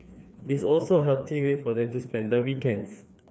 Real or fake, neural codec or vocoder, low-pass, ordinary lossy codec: fake; codec, 16 kHz, 2 kbps, FreqCodec, larger model; none; none